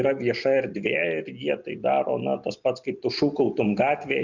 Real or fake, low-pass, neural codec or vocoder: real; 7.2 kHz; none